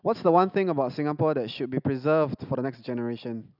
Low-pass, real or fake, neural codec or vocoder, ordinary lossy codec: 5.4 kHz; real; none; none